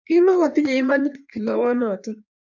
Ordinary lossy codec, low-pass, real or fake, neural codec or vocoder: MP3, 64 kbps; 7.2 kHz; fake; codec, 16 kHz in and 24 kHz out, 1.1 kbps, FireRedTTS-2 codec